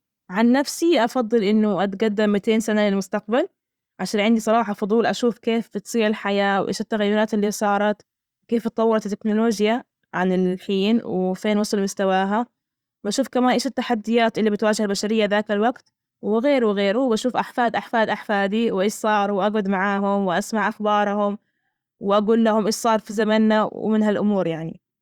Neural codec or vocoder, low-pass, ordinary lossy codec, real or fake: vocoder, 44.1 kHz, 128 mel bands every 512 samples, BigVGAN v2; 19.8 kHz; Opus, 64 kbps; fake